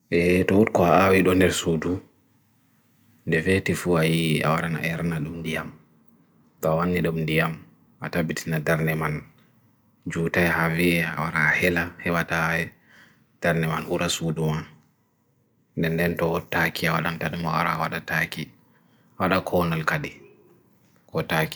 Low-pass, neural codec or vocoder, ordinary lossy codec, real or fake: none; none; none; real